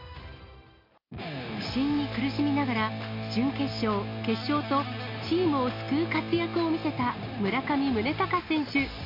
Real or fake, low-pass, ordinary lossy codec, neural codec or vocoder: real; 5.4 kHz; MP3, 48 kbps; none